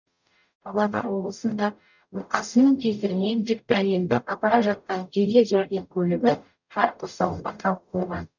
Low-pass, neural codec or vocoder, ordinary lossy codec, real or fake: 7.2 kHz; codec, 44.1 kHz, 0.9 kbps, DAC; none; fake